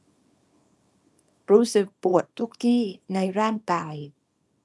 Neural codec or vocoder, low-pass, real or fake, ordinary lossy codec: codec, 24 kHz, 0.9 kbps, WavTokenizer, small release; none; fake; none